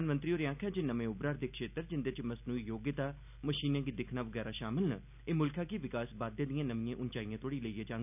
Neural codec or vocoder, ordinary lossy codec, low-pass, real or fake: none; none; 3.6 kHz; real